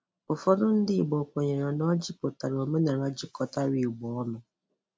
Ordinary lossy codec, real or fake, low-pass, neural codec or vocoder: none; real; none; none